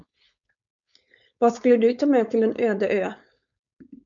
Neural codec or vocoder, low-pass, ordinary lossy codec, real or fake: codec, 16 kHz, 4.8 kbps, FACodec; 7.2 kHz; MP3, 64 kbps; fake